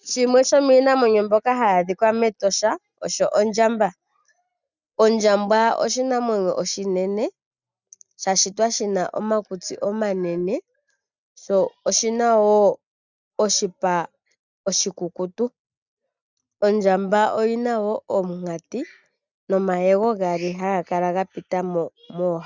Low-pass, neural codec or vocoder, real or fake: 7.2 kHz; none; real